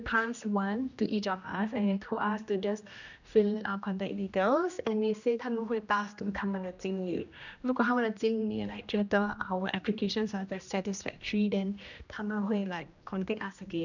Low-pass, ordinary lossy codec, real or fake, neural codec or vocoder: 7.2 kHz; none; fake; codec, 16 kHz, 1 kbps, X-Codec, HuBERT features, trained on general audio